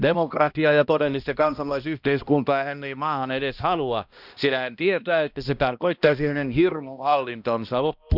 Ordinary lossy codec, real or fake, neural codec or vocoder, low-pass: none; fake; codec, 16 kHz, 1 kbps, X-Codec, HuBERT features, trained on balanced general audio; 5.4 kHz